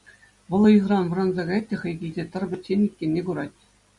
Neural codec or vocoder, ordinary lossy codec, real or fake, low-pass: none; Opus, 64 kbps; real; 10.8 kHz